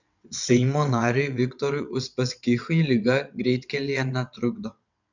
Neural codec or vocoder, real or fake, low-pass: vocoder, 22.05 kHz, 80 mel bands, WaveNeXt; fake; 7.2 kHz